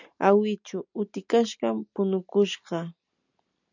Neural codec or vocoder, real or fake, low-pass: none; real; 7.2 kHz